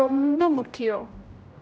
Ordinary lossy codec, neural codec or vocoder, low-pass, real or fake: none; codec, 16 kHz, 0.5 kbps, X-Codec, HuBERT features, trained on general audio; none; fake